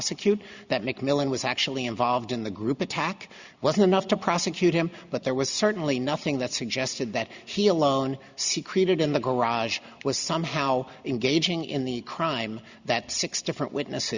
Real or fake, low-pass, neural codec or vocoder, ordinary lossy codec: real; 7.2 kHz; none; Opus, 64 kbps